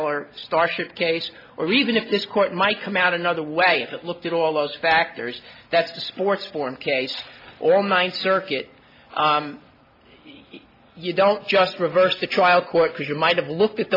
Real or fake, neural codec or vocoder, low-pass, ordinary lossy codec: real; none; 5.4 kHz; MP3, 48 kbps